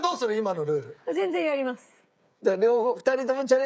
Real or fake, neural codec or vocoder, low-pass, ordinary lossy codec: fake; codec, 16 kHz, 8 kbps, FreqCodec, smaller model; none; none